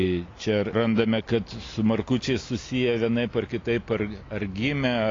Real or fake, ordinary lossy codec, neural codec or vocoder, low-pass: real; AAC, 32 kbps; none; 7.2 kHz